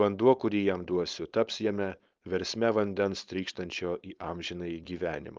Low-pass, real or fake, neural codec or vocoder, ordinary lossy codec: 7.2 kHz; fake; codec, 16 kHz, 4.8 kbps, FACodec; Opus, 24 kbps